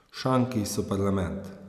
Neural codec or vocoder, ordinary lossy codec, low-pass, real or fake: none; none; 14.4 kHz; real